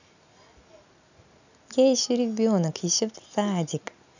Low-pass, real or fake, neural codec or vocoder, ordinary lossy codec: 7.2 kHz; real; none; none